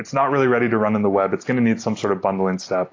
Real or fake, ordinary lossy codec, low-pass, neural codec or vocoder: real; AAC, 32 kbps; 7.2 kHz; none